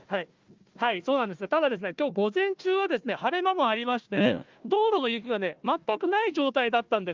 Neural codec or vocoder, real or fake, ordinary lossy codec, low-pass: codec, 16 kHz, 1 kbps, FunCodec, trained on Chinese and English, 50 frames a second; fake; Opus, 32 kbps; 7.2 kHz